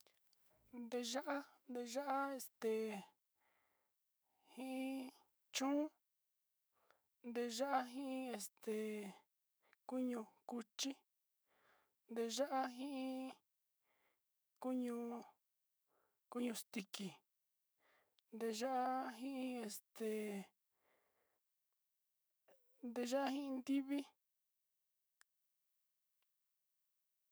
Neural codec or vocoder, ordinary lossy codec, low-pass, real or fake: autoencoder, 48 kHz, 128 numbers a frame, DAC-VAE, trained on Japanese speech; none; none; fake